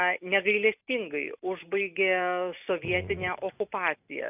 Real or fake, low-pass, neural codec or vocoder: real; 3.6 kHz; none